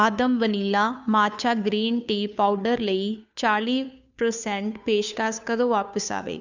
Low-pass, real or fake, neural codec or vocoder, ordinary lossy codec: 7.2 kHz; fake; codec, 16 kHz, 2 kbps, FunCodec, trained on Chinese and English, 25 frames a second; none